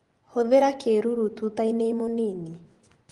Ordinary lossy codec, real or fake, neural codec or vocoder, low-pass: Opus, 24 kbps; fake; vocoder, 24 kHz, 100 mel bands, Vocos; 10.8 kHz